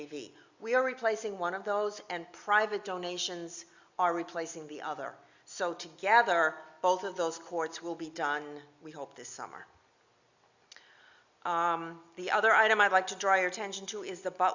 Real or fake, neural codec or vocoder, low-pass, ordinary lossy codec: real; none; 7.2 kHz; Opus, 64 kbps